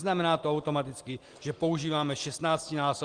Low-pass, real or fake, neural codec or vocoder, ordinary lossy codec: 9.9 kHz; real; none; Opus, 24 kbps